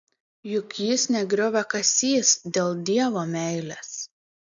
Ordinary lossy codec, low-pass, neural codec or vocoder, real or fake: MP3, 64 kbps; 7.2 kHz; none; real